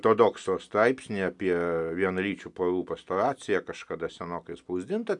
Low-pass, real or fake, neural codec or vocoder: 10.8 kHz; real; none